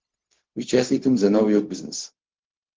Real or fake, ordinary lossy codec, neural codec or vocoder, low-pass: fake; Opus, 16 kbps; codec, 16 kHz, 0.4 kbps, LongCat-Audio-Codec; 7.2 kHz